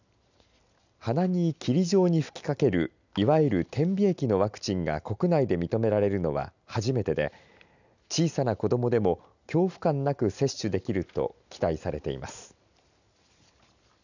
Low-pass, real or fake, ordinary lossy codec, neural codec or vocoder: 7.2 kHz; real; none; none